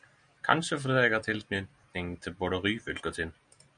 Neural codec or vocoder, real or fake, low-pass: none; real; 9.9 kHz